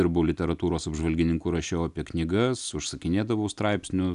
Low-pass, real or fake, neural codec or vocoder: 10.8 kHz; real; none